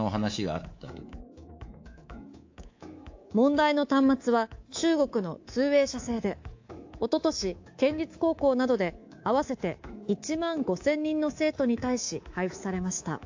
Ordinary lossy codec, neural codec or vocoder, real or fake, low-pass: AAC, 48 kbps; codec, 24 kHz, 3.1 kbps, DualCodec; fake; 7.2 kHz